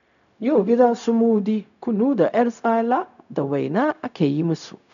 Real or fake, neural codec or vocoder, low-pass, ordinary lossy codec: fake; codec, 16 kHz, 0.4 kbps, LongCat-Audio-Codec; 7.2 kHz; none